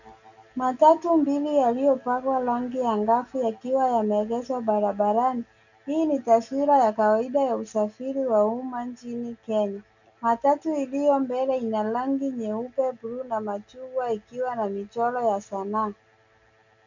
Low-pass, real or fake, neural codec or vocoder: 7.2 kHz; real; none